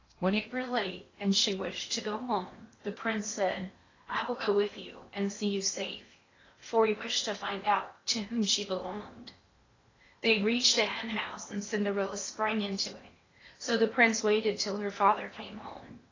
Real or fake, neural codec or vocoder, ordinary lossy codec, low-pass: fake; codec, 16 kHz in and 24 kHz out, 0.8 kbps, FocalCodec, streaming, 65536 codes; AAC, 32 kbps; 7.2 kHz